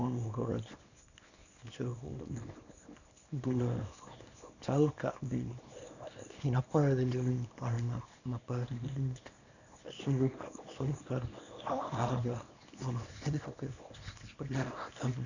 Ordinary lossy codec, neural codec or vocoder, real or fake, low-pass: none; codec, 24 kHz, 0.9 kbps, WavTokenizer, small release; fake; 7.2 kHz